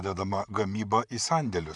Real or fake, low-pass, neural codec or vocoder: fake; 10.8 kHz; vocoder, 44.1 kHz, 128 mel bands, Pupu-Vocoder